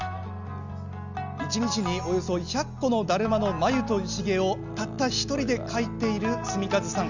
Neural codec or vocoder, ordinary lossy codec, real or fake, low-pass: none; none; real; 7.2 kHz